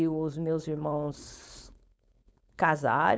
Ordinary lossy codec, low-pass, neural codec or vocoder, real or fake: none; none; codec, 16 kHz, 4.8 kbps, FACodec; fake